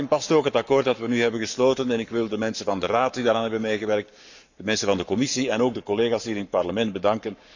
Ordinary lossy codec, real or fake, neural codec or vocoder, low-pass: none; fake; codec, 44.1 kHz, 7.8 kbps, Pupu-Codec; 7.2 kHz